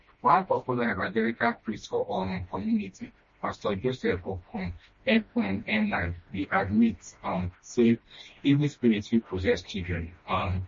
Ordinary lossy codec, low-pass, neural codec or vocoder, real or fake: MP3, 32 kbps; 7.2 kHz; codec, 16 kHz, 1 kbps, FreqCodec, smaller model; fake